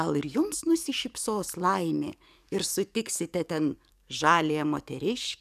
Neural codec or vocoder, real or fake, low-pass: codec, 44.1 kHz, 7.8 kbps, DAC; fake; 14.4 kHz